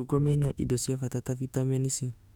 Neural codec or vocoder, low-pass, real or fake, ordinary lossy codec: autoencoder, 48 kHz, 32 numbers a frame, DAC-VAE, trained on Japanese speech; 19.8 kHz; fake; none